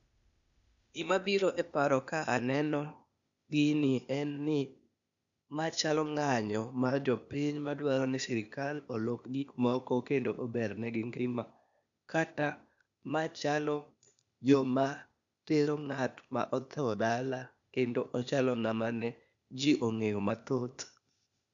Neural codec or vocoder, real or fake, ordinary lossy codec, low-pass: codec, 16 kHz, 0.8 kbps, ZipCodec; fake; none; 7.2 kHz